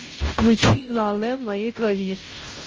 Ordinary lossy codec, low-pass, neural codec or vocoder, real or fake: Opus, 24 kbps; 7.2 kHz; codec, 16 kHz, 0.5 kbps, FunCodec, trained on Chinese and English, 25 frames a second; fake